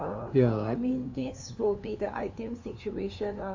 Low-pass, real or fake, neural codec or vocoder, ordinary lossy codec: 7.2 kHz; fake; codec, 16 kHz, 2 kbps, FunCodec, trained on LibriTTS, 25 frames a second; AAC, 48 kbps